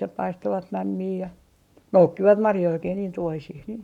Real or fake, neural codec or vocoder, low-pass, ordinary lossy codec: fake; codec, 44.1 kHz, 7.8 kbps, Pupu-Codec; 19.8 kHz; none